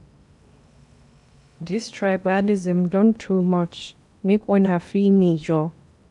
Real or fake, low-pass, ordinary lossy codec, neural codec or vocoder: fake; 10.8 kHz; none; codec, 16 kHz in and 24 kHz out, 0.8 kbps, FocalCodec, streaming, 65536 codes